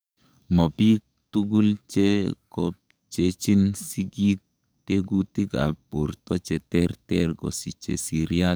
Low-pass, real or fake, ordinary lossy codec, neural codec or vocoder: none; fake; none; codec, 44.1 kHz, 7.8 kbps, DAC